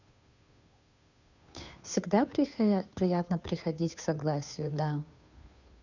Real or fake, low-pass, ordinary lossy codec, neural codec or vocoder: fake; 7.2 kHz; none; codec, 16 kHz, 2 kbps, FunCodec, trained on Chinese and English, 25 frames a second